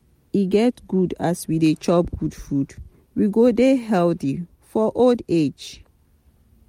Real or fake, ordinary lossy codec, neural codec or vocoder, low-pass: real; MP3, 64 kbps; none; 19.8 kHz